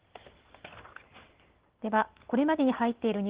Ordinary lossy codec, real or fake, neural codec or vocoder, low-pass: Opus, 16 kbps; real; none; 3.6 kHz